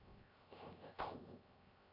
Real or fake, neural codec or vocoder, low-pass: fake; codec, 16 kHz, 0.3 kbps, FocalCodec; 5.4 kHz